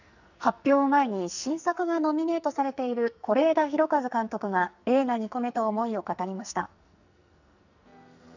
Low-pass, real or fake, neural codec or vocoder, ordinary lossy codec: 7.2 kHz; fake; codec, 44.1 kHz, 2.6 kbps, SNAC; none